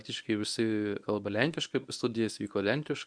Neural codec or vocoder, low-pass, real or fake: codec, 24 kHz, 0.9 kbps, WavTokenizer, medium speech release version 1; 9.9 kHz; fake